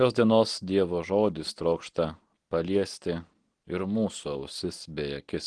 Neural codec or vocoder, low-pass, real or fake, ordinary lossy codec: none; 9.9 kHz; real; Opus, 16 kbps